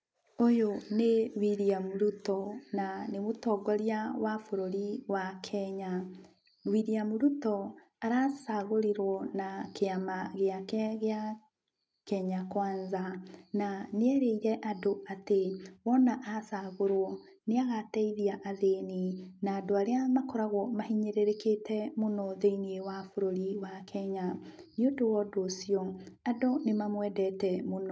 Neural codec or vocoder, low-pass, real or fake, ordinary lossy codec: none; none; real; none